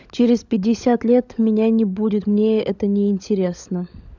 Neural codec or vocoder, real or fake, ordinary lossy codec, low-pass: codec, 16 kHz, 16 kbps, FunCodec, trained on LibriTTS, 50 frames a second; fake; none; 7.2 kHz